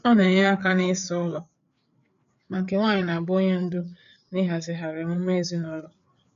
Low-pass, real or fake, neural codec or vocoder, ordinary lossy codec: 7.2 kHz; fake; codec, 16 kHz, 4 kbps, FreqCodec, larger model; MP3, 96 kbps